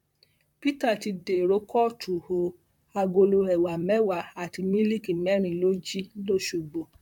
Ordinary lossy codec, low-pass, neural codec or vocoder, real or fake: none; 19.8 kHz; vocoder, 44.1 kHz, 128 mel bands, Pupu-Vocoder; fake